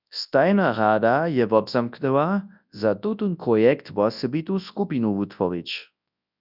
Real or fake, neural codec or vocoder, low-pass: fake; codec, 24 kHz, 0.9 kbps, WavTokenizer, large speech release; 5.4 kHz